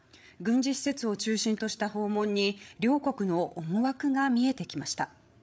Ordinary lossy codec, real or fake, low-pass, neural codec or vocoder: none; fake; none; codec, 16 kHz, 8 kbps, FreqCodec, larger model